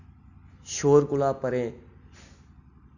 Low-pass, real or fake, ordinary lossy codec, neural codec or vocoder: 7.2 kHz; real; none; none